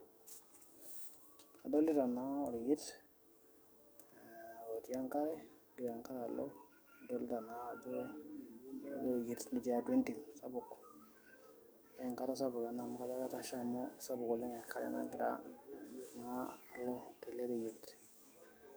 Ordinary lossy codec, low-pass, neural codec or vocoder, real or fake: none; none; codec, 44.1 kHz, 7.8 kbps, DAC; fake